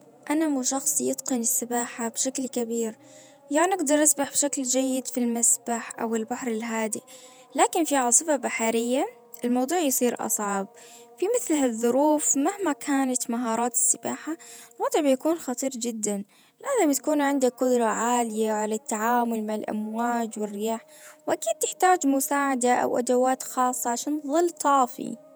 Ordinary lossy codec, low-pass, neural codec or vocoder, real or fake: none; none; vocoder, 48 kHz, 128 mel bands, Vocos; fake